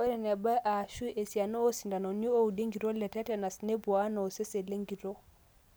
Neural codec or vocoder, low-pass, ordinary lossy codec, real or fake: none; none; none; real